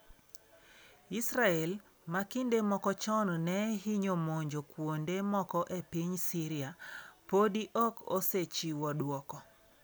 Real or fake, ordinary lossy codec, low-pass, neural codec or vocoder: real; none; none; none